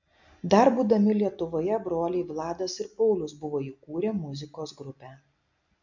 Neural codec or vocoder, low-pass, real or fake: none; 7.2 kHz; real